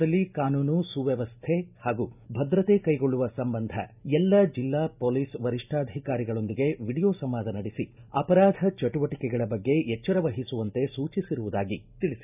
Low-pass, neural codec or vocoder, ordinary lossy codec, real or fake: 3.6 kHz; none; none; real